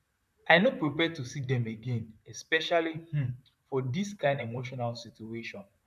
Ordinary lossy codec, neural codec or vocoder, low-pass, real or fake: none; vocoder, 44.1 kHz, 128 mel bands, Pupu-Vocoder; 14.4 kHz; fake